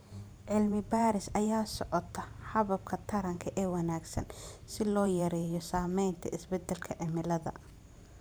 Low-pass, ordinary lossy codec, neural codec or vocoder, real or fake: none; none; vocoder, 44.1 kHz, 128 mel bands every 512 samples, BigVGAN v2; fake